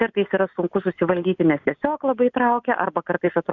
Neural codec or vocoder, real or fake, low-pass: none; real; 7.2 kHz